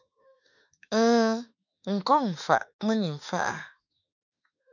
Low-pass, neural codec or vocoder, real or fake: 7.2 kHz; autoencoder, 48 kHz, 32 numbers a frame, DAC-VAE, trained on Japanese speech; fake